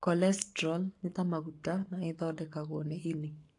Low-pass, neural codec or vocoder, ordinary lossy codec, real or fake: 10.8 kHz; codec, 44.1 kHz, 7.8 kbps, Pupu-Codec; AAC, 48 kbps; fake